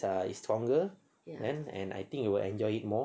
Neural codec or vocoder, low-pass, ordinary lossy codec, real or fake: none; none; none; real